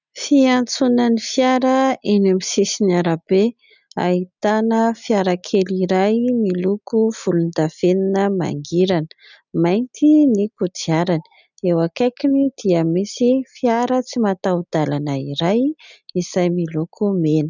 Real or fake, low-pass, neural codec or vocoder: real; 7.2 kHz; none